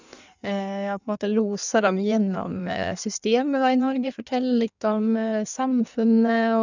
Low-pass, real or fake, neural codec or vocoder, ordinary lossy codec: 7.2 kHz; fake; codec, 16 kHz in and 24 kHz out, 1.1 kbps, FireRedTTS-2 codec; none